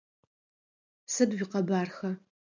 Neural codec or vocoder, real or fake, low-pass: none; real; 7.2 kHz